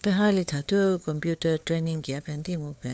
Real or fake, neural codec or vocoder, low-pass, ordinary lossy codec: fake; codec, 16 kHz, 4 kbps, FunCodec, trained on LibriTTS, 50 frames a second; none; none